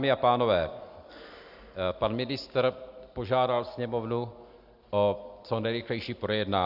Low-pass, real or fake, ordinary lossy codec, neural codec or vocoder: 5.4 kHz; real; Opus, 64 kbps; none